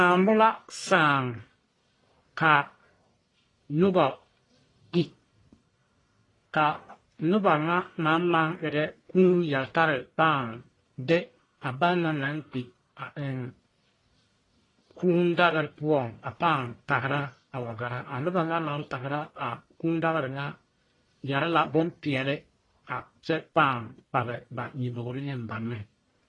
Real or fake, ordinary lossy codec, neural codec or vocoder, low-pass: fake; AAC, 32 kbps; codec, 44.1 kHz, 1.7 kbps, Pupu-Codec; 10.8 kHz